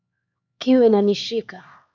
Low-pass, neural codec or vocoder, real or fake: 7.2 kHz; codec, 16 kHz, 4 kbps, X-Codec, HuBERT features, trained on LibriSpeech; fake